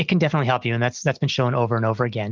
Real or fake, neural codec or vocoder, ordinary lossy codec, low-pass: real; none; Opus, 24 kbps; 7.2 kHz